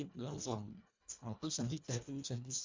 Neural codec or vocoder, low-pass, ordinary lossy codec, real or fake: codec, 24 kHz, 1.5 kbps, HILCodec; 7.2 kHz; MP3, 64 kbps; fake